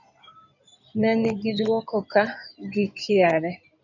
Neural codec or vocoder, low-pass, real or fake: codec, 16 kHz in and 24 kHz out, 2.2 kbps, FireRedTTS-2 codec; 7.2 kHz; fake